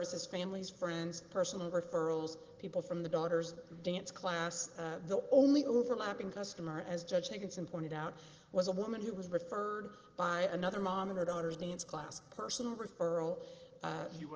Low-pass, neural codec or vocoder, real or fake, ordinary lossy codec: 7.2 kHz; none; real; Opus, 16 kbps